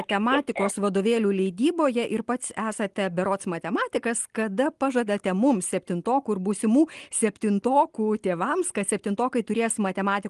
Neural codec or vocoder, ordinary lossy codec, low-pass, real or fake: none; Opus, 24 kbps; 10.8 kHz; real